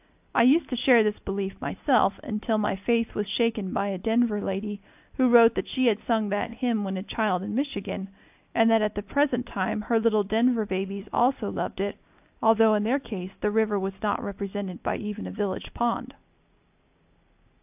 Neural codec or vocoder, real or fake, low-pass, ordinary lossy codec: none; real; 3.6 kHz; AAC, 32 kbps